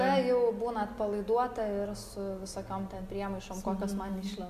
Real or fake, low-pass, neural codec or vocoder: real; 14.4 kHz; none